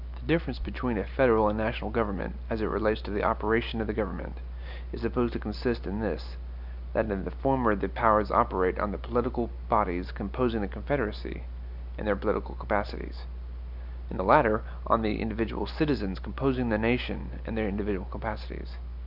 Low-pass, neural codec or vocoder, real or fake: 5.4 kHz; none; real